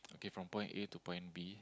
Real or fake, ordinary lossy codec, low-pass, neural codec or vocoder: real; none; none; none